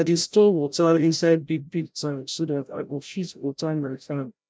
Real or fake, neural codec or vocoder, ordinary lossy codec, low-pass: fake; codec, 16 kHz, 0.5 kbps, FreqCodec, larger model; none; none